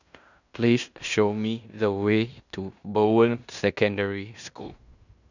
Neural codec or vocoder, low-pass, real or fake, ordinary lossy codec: codec, 16 kHz in and 24 kHz out, 0.9 kbps, LongCat-Audio-Codec, fine tuned four codebook decoder; 7.2 kHz; fake; none